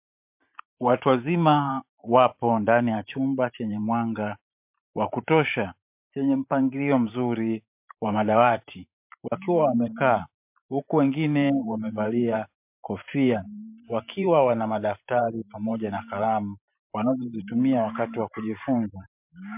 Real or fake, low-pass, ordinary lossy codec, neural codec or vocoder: fake; 3.6 kHz; MP3, 32 kbps; vocoder, 44.1 kHz, 128 mel bands every 512 samples, BigVGAN v2